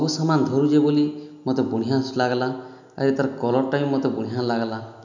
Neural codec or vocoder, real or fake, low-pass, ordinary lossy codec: none; real; 7.2 kHz; none